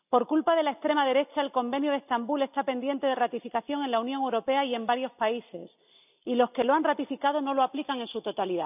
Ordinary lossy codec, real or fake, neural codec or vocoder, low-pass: none; real; none; 3.6 kHz